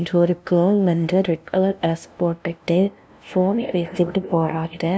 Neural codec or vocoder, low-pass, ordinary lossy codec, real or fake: codec, 16 kHz, 0.5 kbps, FunCodec, trained on LibriTTS, 25 frames a second; none; none; fake